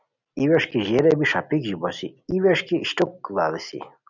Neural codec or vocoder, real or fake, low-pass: none; real; 7.2 kHz